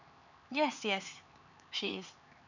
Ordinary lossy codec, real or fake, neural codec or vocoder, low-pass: none; fake; codec, 16 kHz, 4 kbps, X-Codec, HuBERT features, trained on LibriSpeech; 7.2 kHz